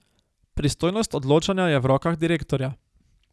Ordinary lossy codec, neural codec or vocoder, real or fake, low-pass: none; none; real; none